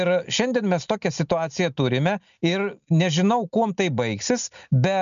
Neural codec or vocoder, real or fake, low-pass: none; real; 7.2 kHz